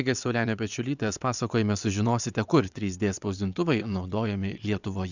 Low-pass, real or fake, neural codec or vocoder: 7.2 kHz; fake; vocoder, 22.05 kHz, 80 mel bands, WaveNeXt